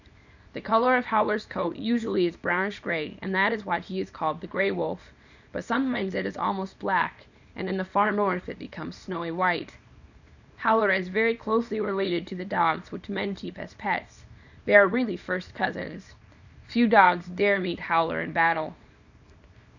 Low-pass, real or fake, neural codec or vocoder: 7.2 kHz; fake; codec, 24 kHz, 0.9 kbps, WavTokenizer, small release